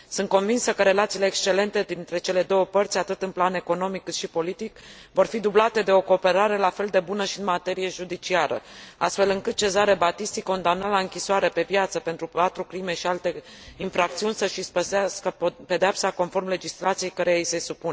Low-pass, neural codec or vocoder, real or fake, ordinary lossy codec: none; none; real; none